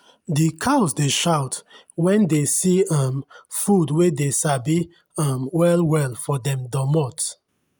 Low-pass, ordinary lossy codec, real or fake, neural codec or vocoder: none; none; real; none